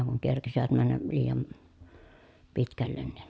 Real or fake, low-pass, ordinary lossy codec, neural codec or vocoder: real; none; none; none